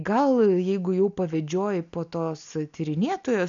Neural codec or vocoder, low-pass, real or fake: none; 7.2 kHz; real